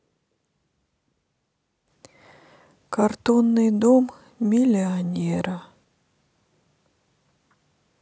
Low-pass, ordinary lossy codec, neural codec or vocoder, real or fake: none; none; none; real